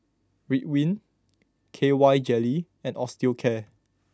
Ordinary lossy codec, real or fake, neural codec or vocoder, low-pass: none; real; none; none